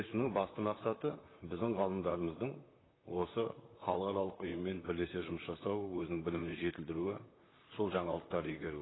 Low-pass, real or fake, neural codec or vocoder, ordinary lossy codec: 7.2 kHz; fake; vocoder, 44.1 kHz, 128 mel bands, Pupu-Vocoder; AAC, 16 kbps